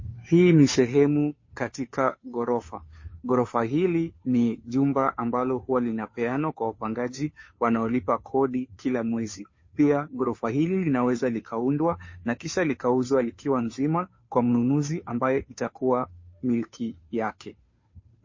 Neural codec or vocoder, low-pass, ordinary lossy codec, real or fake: codec, 16 kHz, 2 kbps, FunCodec, trained on Chinese and English, 25 frames a second; 7.2 kHz; MP3, 32 kbps; fake